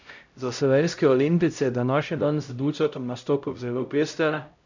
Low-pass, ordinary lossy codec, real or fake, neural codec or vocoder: 7.2 kHz; none; fake; codec, 16 kHz, 0.5 kbps, X-Codec, HuBERT features, trained on LibriSpeech